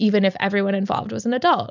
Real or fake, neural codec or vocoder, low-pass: real; none; 7.2 kHz